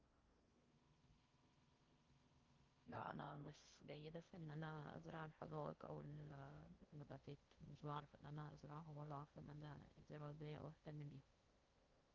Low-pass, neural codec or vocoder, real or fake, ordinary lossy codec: 7.2 kHz; codec, 16 kHz in and 24 kHz out, 0.6 kbps, FocalCodec, streaming, 4096 codes; fake; Opus, 24 kbps